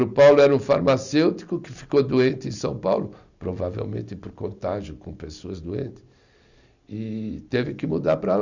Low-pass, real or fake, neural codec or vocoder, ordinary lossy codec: 7.2 kHz; real; none; none